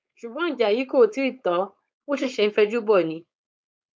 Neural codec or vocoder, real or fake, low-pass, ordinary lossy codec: codec, 16 kHz, 4.8 kbps, FACodec; fake; none; none